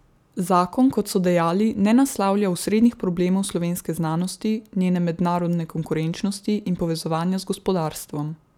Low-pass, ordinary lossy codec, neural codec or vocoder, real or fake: 19.8 kHz; none; none; real